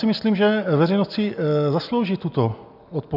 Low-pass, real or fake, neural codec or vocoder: 5.4 kHz; real; none